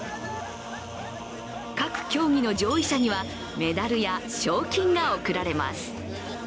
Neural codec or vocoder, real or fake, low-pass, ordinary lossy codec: none; real; none; none